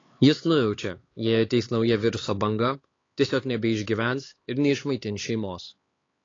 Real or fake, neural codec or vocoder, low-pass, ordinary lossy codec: fake; codec, 16 kHz, 4 kbps, X-Codec, HuBERT features, trained on LibriSpeech; 7.2 kHz; AAC, 32 kbps